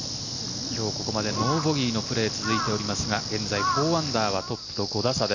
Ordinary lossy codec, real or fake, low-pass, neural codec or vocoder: none; real; 7.2 kHz; none